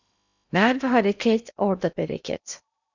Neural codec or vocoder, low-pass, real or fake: codec, 16 kHz in and 24 kHz out, 0.8 kbps, FocalCodec, streaming, 65536 codes; 7.2 kHz; fake